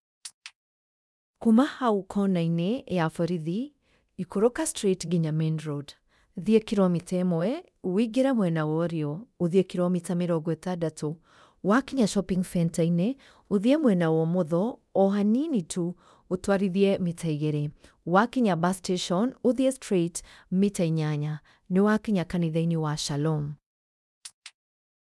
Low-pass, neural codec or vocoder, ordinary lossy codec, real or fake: none; codec, 24 kHz, 0.9 kbps, DualCodec; none; fake